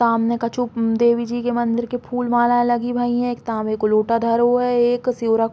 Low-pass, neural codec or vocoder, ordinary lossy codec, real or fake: none; none; none; real